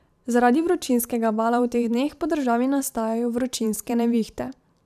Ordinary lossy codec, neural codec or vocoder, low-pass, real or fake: none; vocoder, 44.1 kHz, 128 mel bands every 512 samples, BigVGAN v2; 14.4 kHz; fake